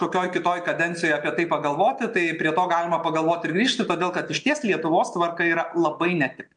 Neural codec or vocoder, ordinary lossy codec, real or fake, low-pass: none; MP3, 64 kbps; real; 9.9 kHz